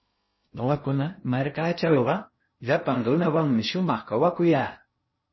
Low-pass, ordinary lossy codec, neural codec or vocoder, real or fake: 7.2 kHz; MP3, 24 kbps; codec, 16 kHz in and 24 kHz out, 0.6 kbps, FocalCodec, streaming, 2048 codes; fake